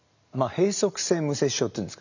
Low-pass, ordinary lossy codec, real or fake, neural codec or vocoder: 7.2 kHz; none; real; none